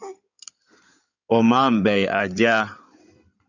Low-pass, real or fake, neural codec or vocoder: 7.2 kHz; fake; codec, 16 kHz in and 24 kHz out, 2.2 kbps, FireRedTTS-2 codec